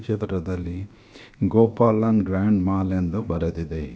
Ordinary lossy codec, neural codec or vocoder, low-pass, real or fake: none; codec, 16 kHz, about 1 kbps, DyCAST, with the encoder's durations; none; fake